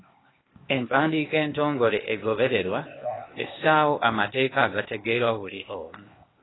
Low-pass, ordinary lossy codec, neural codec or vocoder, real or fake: 7.2 kHz; AAC, 16 kbps; codec, 16 kHz, 0.8 kbps, ZipCodec; fake